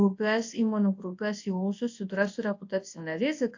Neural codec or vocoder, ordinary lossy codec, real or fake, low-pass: codec, 24 kHz, 0.9 kbps, WavTokenizer, large speech release; AAC, 48 kbps; fake; 7.2 kHz